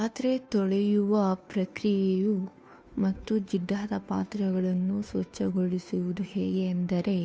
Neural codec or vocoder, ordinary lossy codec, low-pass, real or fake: codec, 16 kHz, 2 kbps, FunCodec, trained on Chinese and English, 25 frames a second; none; none; fake